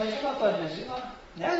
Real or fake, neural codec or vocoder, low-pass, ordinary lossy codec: fake; vocoder, 44.1 kHz, 128 mel bands, Pupu-Vocoder; 19.8 kHz; AAC, 24 kbps